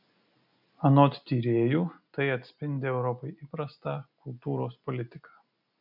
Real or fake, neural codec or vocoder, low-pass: real; none; 5.4 kHz